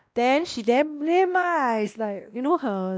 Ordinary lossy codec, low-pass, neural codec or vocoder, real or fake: none; none; codec, 16 kHz, 1 kbps, X-Codec, WavLM features, trained on Multilingual LibriSpeech; fake